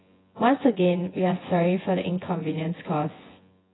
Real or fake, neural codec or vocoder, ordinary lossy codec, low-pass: fake; vocoder, 24 kHz, 100 mel bands, Vocos; AAC, 16 kbps; 7.2 kHz